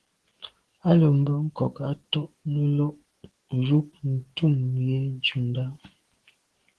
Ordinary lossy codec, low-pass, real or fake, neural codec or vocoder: Opus, 16 kbps; 10.8 kHz; fake; codec, 44.1 kHz, 7.8 kbps, DAC